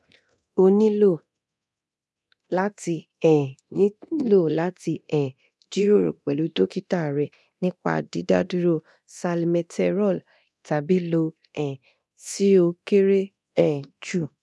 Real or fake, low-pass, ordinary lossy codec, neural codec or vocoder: fake; none; none; codec, 24 kHz, 0.9 kbps, DualCodec